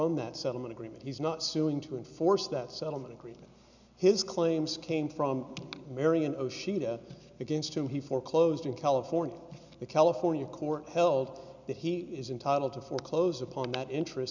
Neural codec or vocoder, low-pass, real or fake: none; 7.2 kHz; real